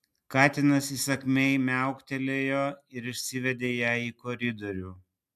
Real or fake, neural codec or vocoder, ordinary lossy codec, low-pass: real; none; AAC, 96 kbps; 14.4 kHz